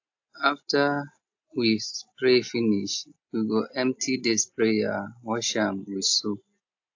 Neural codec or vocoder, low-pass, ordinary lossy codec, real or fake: none; 7.2 kHz; AAC, 48 kbps; real